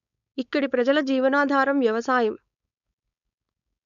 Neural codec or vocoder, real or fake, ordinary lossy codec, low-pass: codec, 16 kHz, 4.8 kbps, FACodec; fake; none; 7.2 kHz